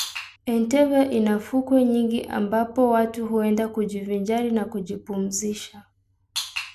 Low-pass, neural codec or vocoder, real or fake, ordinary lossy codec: 14.4 kHz; none; real; MP3, 96 kbps